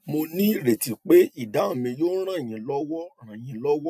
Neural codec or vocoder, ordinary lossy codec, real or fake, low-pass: none; none; real; 14.4 kHz